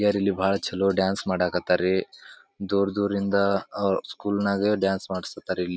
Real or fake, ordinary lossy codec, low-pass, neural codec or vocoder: real; none; none; none